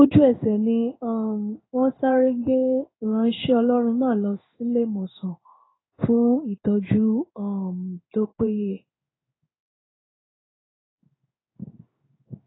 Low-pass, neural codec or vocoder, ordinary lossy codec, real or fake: 7.2 kHz; codec, 16 kHz in and 24 kHz out, 1 kbps, XY-Tokenizer; AAC, 16 kbps; fake